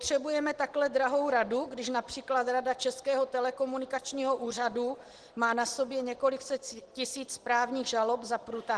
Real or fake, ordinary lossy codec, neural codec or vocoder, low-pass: real; Opus, 16 kbps; none; 10.8 kHz